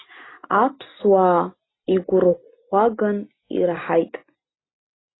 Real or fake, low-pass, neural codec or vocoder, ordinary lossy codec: real; 7.2 kHz; none; AAC, 16 kbps